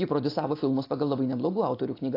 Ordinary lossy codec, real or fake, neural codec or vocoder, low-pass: AAC, 32 kbps; real; none; 5.4 kHz